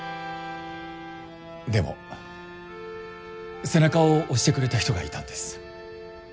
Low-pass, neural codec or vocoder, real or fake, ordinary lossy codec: none; none; real; none